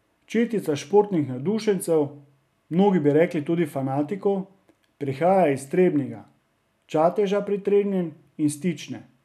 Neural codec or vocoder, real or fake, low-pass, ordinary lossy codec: none; real; 14.4 kHz; none